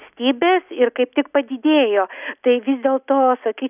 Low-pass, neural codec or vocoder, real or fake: 3.6 kHz; none; real